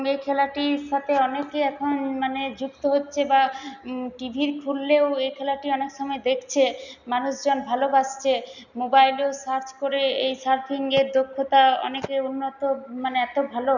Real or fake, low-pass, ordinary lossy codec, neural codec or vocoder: real; 7.2 kHz; none; none